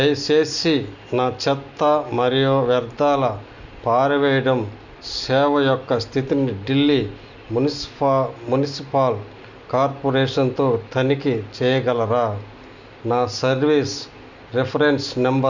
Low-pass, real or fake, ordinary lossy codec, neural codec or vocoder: 7.2 kHz; real; none; none